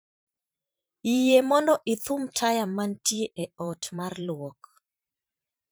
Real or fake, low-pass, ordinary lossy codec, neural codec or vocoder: fake; none; none; vocoder, 44.1 kHz, 128 mel bands every 512 samples, BigVGAN v2